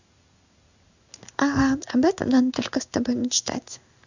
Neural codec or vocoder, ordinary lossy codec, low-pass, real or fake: codec, 16 kHz in and 24 kHz out, 1 kbps, XY-Tokenizer; none; 7.2 kHz; fake